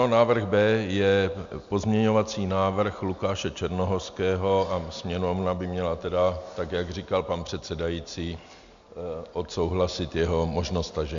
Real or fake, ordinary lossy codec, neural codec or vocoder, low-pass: real; MP3, 64 kbps; none; 7.2 kHz